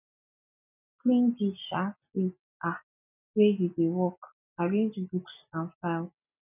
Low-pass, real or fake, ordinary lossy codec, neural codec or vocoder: 3.6 kHz; real; none; none